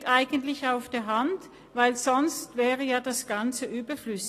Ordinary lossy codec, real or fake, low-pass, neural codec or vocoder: AAC, 48 kbps; real; 14.4 kHz; none